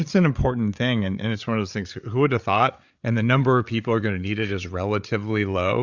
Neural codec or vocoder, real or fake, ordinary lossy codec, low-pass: codec, 16 kHz, 8 kbps, FreqCodec, larger model; fake; Opus, 64 kbps; 7.2 kHz